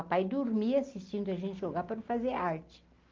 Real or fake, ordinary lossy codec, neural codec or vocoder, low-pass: real; Opus, 32 kbps; none; 7.2 kHz